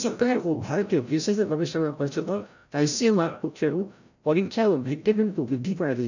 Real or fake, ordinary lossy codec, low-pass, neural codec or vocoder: fake; none; 7.2 kHz; codec, 16 kHz, 0.5 kbps, FreqCodec, larger model